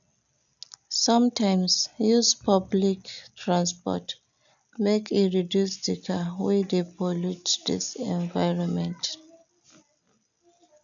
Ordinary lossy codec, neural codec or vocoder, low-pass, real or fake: none; none; 7.2 kHz; real